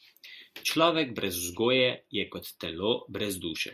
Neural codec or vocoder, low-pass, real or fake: none; 14.4 kHz; real